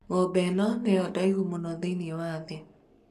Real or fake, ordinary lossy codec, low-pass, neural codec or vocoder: fake; none; 14.4 kHz; codec, 44.1 kHz, 7.8 kbps, Pupu-Codec